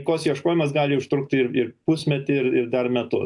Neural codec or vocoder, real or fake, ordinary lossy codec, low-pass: none; real; AAC, 64 kbps; 10.8 kHz